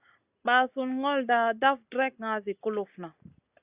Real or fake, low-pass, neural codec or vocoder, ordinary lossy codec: real; 3.6 kHz; none; Opus, 64 kbps